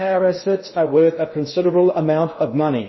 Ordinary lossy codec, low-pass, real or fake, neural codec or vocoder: MP3, 24 kbps; 7.2 kHz; fake; codec, 16 kHz in and 24 kHz out, 0.6 kbps, FocalCodec, streaming, 2048 codes